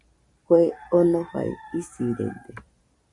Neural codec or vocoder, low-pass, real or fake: vocoder, 44.1 kHz, 128 mel bands every 256 samples, BigVGAN v2; 10.8 kHz; fake